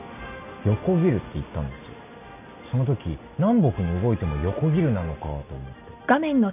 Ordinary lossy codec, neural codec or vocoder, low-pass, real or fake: none; none; 3.6 kHz; real